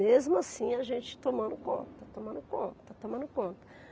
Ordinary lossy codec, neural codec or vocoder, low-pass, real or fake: none; none; none; real